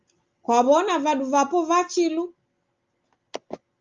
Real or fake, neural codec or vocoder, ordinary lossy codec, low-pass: real; none; Opus, 32 kbps; 7.2 kHz